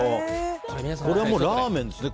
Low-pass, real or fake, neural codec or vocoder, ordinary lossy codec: none; real; none; none